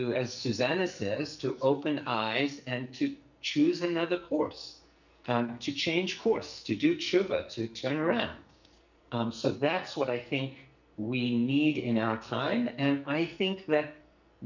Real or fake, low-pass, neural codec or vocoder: fake; 7.2 kHz; codec, 44.1 kHz, 2.6 kbps, SNAC